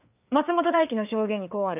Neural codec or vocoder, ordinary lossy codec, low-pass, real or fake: codec, 16 kHz, 4 kbps, FreqCodec, larger model; none; 3.6 kHz; fake